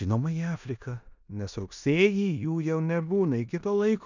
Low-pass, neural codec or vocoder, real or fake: 7.2 kHz; codec, 16 kHz in and 24 kHz out, 0.9 kbps, LongCat-Audio-Codec, four codebook decoder; fake